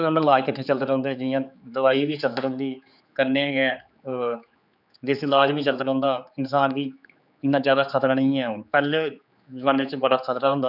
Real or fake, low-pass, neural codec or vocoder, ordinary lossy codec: fake; 5.4 kHz; codec, 16 kHz, 4 kbps, X-Codec, HuBERT features, trained on general audio; none